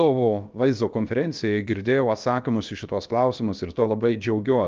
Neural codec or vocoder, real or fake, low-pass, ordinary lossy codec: codec, 16 kHz, about 1 kbps, DyCAST, with the encoder's durations; fake; 7.2 kHz; Opus, 24 kbps